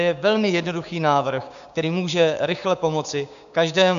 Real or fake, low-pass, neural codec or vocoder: fake; 7.2 kHz; codec, 16 kHz, 6 kbps, DAC